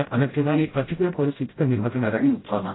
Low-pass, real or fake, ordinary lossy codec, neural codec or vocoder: 7.2 kHz; fake; AAC, 16 kbps; codec, 16 kHz, 0.5 kbps, FreqCodec, smaller model